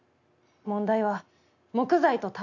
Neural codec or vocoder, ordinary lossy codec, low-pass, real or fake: none; none; 7.2 kHz; real